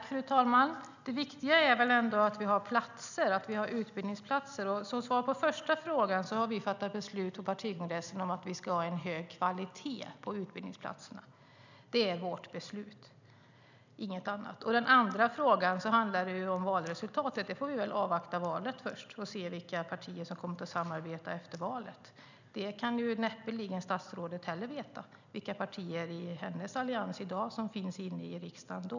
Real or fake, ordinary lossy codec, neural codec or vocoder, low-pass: real; none; none; 7.2 kHz